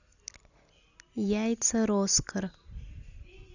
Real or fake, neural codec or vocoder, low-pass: real; none; 7.2 kHz